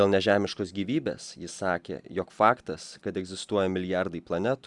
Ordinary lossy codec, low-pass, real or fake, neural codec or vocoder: Opus, 64 kbps; 10.8 kHz; real; none